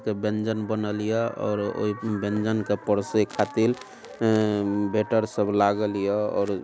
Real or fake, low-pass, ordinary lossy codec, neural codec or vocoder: real; none; none; none